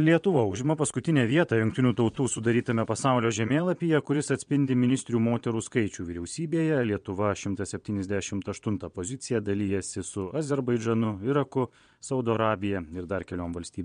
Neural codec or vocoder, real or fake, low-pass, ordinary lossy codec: vocoder, 22.05 kHz, 80 mel bands, Vocos; fake; 9.9 kHz; MP3, 64 kbps